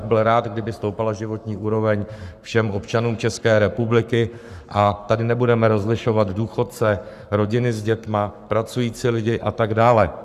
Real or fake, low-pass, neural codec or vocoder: fake; 14.4 kHz; codec, 44.1 kHz, 7.8 kbps, Pupu-Codec